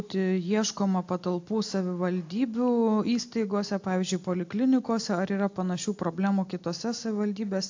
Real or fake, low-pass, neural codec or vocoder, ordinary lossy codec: real; 7.2 kHz; none; AAC, 48 kbps